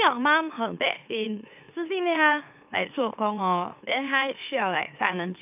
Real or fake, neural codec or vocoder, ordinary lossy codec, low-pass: fake; autoencoder, 44.1 kHz, a latent of 192 numbers a frame, MeloTTS; none; 3.6 kHz